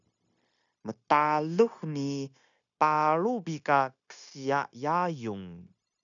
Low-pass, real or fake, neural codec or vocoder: 7.2 kHz; fake; codec, 16 kHz, 0.9 kbps, LongCat-Audio-Codec